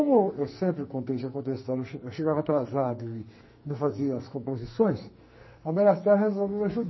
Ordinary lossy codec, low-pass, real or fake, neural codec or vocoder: MP3, 24 kbps; 7.2 kHz; fake; codec, 44.1 kHz, 2.6 kbps, SNAC